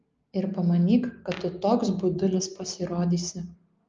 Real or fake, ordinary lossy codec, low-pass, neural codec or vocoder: real; Opus, 24 kbps; 7.2 kHz; none